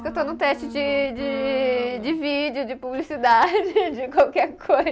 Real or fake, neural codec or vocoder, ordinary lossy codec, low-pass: real; none; none; none